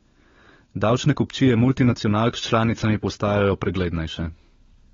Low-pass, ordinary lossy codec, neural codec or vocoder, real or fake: 7.2 kHz; AAC, 24 kbps; codec, 16 kHz, 16 kbps, FunCodec, trained on LibriTTS, 50 frames a second; fake